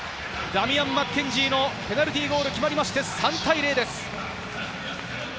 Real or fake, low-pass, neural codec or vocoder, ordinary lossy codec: real; none; none; none